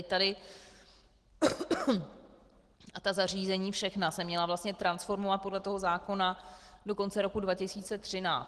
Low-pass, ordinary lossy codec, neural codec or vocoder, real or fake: 10.8 kHz; Opus, 16 kbps; none; real